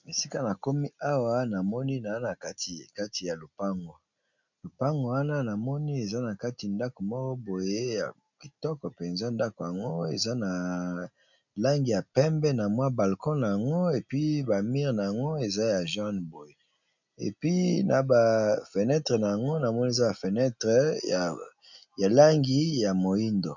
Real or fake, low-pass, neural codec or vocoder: real; 7.2 kHz; none